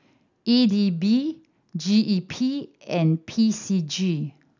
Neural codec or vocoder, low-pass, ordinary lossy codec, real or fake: none; 7.2 kHz; none; real